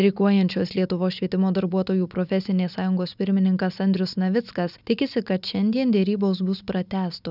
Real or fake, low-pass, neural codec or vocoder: real; 5.4 kHz; none